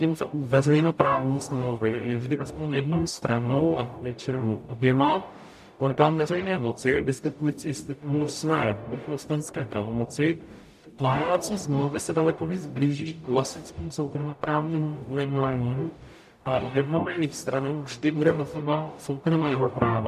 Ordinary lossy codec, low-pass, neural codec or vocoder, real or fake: MP3, 96 kbps; 14.4 kHz; codec, 44.1 kHz, 0.9 kbps, DAC; fake